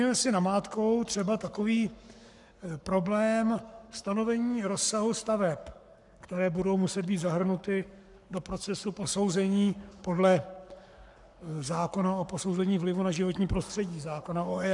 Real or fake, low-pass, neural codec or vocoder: fake; 10.8 kHz; codec, 44.1 kHz, 7.8 kbps, Pupu-Codec